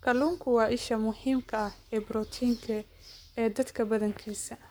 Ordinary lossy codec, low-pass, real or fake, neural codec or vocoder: none; none; fake; codec, 44.1 kHz, 7.8 kbps, Pupu-Codec